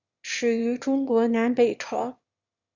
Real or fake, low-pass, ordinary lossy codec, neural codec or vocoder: fake; 7.2 kHz; Opus, 64 kbps; autoencoder, 22.05 kHz, a latent of 192 numbers a frame, VITS, trained on one speaker